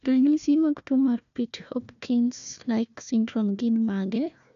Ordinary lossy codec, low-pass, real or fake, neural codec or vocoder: none; 7.2 kHz; fake; codec, 16 kHz, 1 kbps, FunCodec, trained on Chinese and English, 50 frames a second